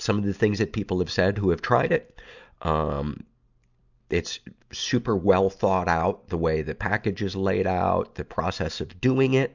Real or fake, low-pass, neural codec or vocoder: real; 7.2 kHz; none